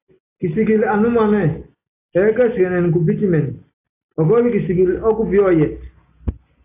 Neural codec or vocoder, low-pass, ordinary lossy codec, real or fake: none; 3.6 kHz; AAC, 24 kbps; real